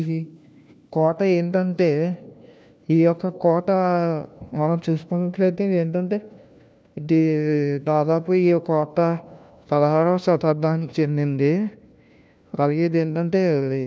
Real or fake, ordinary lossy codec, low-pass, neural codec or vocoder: fake; none; none; codec, 16 kHz, 1 kbps, FunCodec, trained on LibriTTS, 50 frames a second